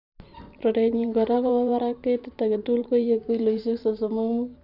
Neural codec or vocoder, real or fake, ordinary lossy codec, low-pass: vocoder, 44.1 kHz, 128 mel bands every 512 samples, BigVGAN v2; fake; none; 5.4 kHz